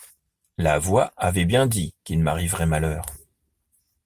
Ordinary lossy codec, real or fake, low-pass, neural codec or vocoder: Opus, 32 kbps; real; 14.4 kHz; none